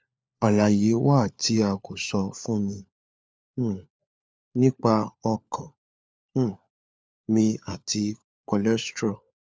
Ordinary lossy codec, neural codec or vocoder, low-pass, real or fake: none; codec, 16 kHz, 4 kbps, FunCodec, trained on LibriTTS, 50 frames a second; none; fake